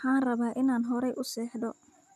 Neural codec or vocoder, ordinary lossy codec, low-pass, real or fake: none; none; 14.4 kHz; real